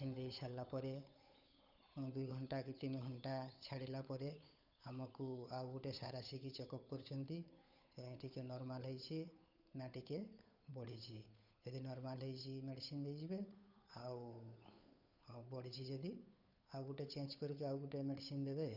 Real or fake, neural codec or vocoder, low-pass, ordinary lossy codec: fake; codec, 16 kHz, 8 kbps, FunCodec, trained on Chinese and English, 25 frames a second; 5.4 kHz; none